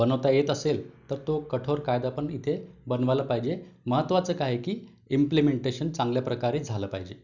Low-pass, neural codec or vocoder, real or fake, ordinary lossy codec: 7.2 kHz; none; real; none